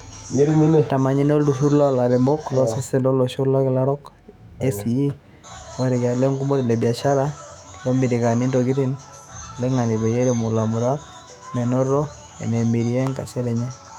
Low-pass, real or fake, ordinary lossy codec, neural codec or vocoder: 19.8 kHz; fake; none; autoencoder, 48 kHz, 128 numbers a frame, DAC-VAE, trained on Japanese speech